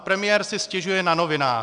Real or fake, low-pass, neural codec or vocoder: real; 9.9 kHz; none